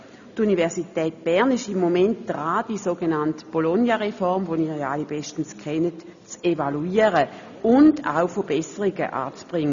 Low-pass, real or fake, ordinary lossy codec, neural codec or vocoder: 7.2 kHz; real; none; none